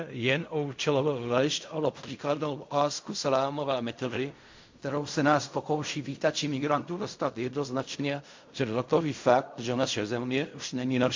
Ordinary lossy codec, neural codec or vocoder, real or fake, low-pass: MP3, 48 kbps; codec, 16 kHz in and 24 kHz out, 0.4 kbps, LongCat-Audio-Codec, fine tuned four codebook decoder; fake; 7.2 kHz